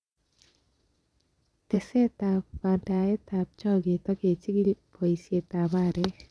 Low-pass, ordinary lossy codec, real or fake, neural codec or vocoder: none; none; fake; vocoder, 22.05 kHz, 80 mel bands, Vocos